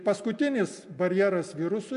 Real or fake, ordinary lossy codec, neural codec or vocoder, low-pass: real; MP3, 64 kbps; none; 10.8 kHz